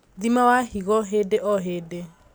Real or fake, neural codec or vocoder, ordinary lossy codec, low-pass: real; none; none; none